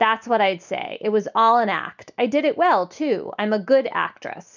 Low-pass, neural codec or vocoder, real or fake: 7.2 kHz; none; real